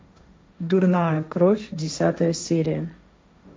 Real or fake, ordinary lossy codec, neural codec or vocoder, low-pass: fake; none; codec, 16 kHz, 1.1 kbps, Voila-Tokenizer; none